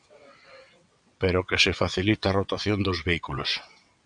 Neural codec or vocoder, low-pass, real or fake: vocoder, 22.05 kHz, 80 mel bands, WaveNeXt; 9.9 kHz; fake